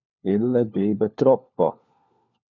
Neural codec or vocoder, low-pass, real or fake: codec, 16 kHz, 4 kbps, FunCodec, trained on LibriTTS, 50 frames a second; 7.2 kHz; fake